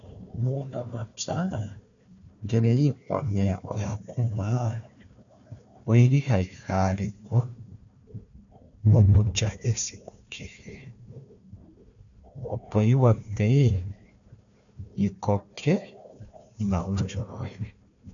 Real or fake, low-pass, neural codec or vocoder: fake; 7.2 kHz; codec, 16 kHz, 1 kbps, FunCodec, trained on Chinese and English, 50 frames a second